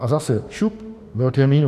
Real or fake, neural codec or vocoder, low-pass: fake; autoencoder, 48 kHz, 32 numbers a frame, DAC-VAE, trained on Japanese speech; 14.4 kHz